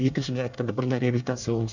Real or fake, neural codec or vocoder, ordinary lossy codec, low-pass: fake; codec, 24 kHz, 1 kbps, SNAC; none; 7.2 kHz